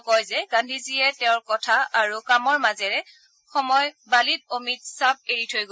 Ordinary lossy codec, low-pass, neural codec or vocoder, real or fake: none; none; none; real